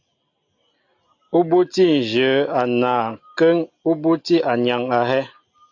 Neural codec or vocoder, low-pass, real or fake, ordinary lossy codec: none; 7.2 kHz; real; AAC, 48 kbps